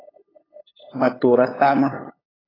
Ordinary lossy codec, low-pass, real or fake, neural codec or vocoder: AAC, 24 kbps; 5.4 kHz; fake; codec, 16 kHz, 8 kbps, FunCodec, trained on LibriTTS, 25 frames a second